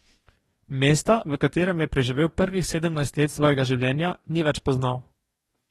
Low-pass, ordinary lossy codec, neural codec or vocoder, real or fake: 19.8 kHz; AAC, 32 kbps; codec, 44.1 kHz, 2.6 kbps, DAC; fake